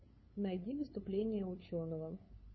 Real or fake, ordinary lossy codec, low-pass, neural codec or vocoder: fake; MP3, 24 kbps; 7.2 kHz; codec, 16 kHz, 8 kbps, FunCodec, trained on LibriTTS, 25 frames a second